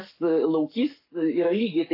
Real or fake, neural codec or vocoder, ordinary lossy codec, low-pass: fake; codec, 44.1 kHz, 7.8 kbps, Pupu-Codec; AAC, 32 kbps; 5.4 kHz